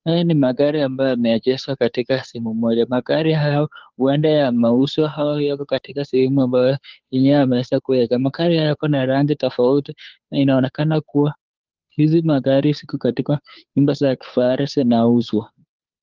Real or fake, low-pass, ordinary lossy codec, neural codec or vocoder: fake; 7.2 kHz; Opus, 32 kbps; codec, 16 kHz, 2 kbps, FunCodec, trained on Chinese and English, 25 frames a second